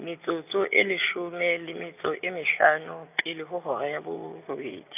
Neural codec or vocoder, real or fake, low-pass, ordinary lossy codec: none; real; 3.6 kHz; none